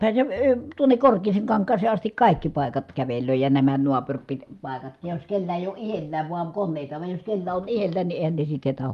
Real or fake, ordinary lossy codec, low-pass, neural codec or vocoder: real; Opus, 32 kbps; 14.4 kHz; none